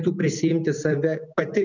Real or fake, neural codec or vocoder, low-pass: real; none; 7.2 kHz